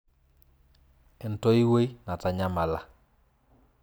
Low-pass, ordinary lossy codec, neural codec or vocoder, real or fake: none; none; none; real